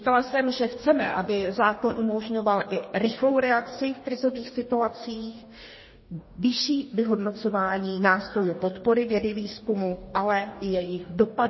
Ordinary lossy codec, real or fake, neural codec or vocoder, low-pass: MP3, 24 kbps; fake; codec, 44.1 kHz, 2.6 kbps, DAC; 7.2 kHz